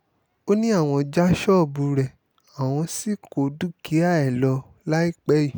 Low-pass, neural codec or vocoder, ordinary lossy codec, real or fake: none; none; none; real